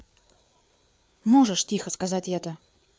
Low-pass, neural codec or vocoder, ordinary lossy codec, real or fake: none; codec, 16 kHz, 4 kbps, FreqCodec, larger model; none; fake